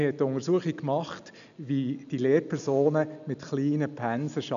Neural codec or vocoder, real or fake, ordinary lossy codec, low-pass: none; real; none; 7.2 kHz